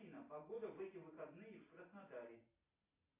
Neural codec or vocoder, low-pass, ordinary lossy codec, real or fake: none; 3.6 kHz; AAC, 16 kbps; real